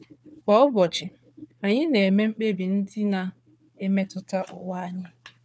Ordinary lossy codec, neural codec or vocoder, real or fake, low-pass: none; codec, 16 kHz, 4 kbps, FunCodec, trained on Chinese and English, 50 frames a second; fake; none